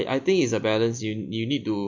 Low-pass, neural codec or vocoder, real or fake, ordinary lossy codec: 7.2 kHz; none; real; MP3, 48 kbps